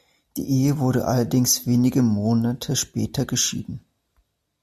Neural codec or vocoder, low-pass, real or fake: none; 14.4 kHz; real